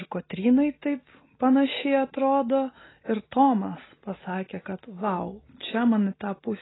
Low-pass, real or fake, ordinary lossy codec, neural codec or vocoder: 7.2 kHz; real; AAC, 16 kbps; none